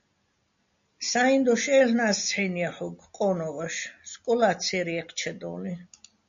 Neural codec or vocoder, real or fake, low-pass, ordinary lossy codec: none; real; 7.2 kHz; MP3, 64 kbps